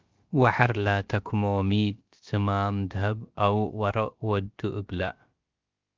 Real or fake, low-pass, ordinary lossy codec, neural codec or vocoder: fake; 7.2 kHz; Opus, 24 kbps; codec, 16 kHz, about 1 kbps, DyCAST, with the encoder's durations